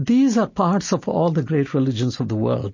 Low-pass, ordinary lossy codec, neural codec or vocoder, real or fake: 7.2 kHz; MP3, 32 kbps; none; real